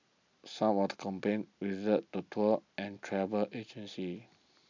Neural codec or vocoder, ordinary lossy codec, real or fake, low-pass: none; MP3, 64 kbps; real; 7.2 kHz